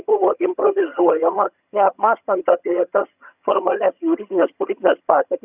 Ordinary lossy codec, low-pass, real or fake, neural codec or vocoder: Opus, 64 kbps; 3.6 kHz; fake; vocoder, 22.05 kHz, 80 mel bands, HiFi-GAN